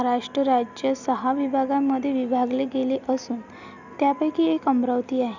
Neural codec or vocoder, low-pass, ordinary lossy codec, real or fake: none; 7.2 kHz; none; real